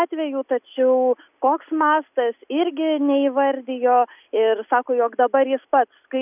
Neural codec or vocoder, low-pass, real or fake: none; 3.6 kHz; real